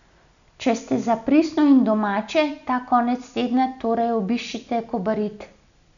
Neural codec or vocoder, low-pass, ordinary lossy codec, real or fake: none; 7.2 kHz; none; real